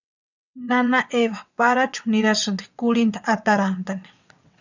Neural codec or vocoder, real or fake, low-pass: vocoder, 22.05 kHz, 80 mel bands, WaveNeXt; fake; 7.2 kHz